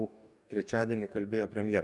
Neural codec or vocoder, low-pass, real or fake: codec, 44.1 kHz, 2.6 kbps, DAC; 10.8 kHz; fake